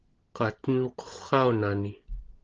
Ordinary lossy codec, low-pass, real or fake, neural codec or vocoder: Opus, 16 kbps; 7.2 kHz; real; none